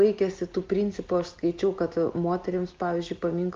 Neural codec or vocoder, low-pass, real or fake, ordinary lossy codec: none; 7.2 kHz; real; Opus, 24 kbps